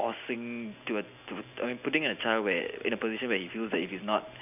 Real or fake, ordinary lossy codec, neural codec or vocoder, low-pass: real; none; none; 3.6 kHz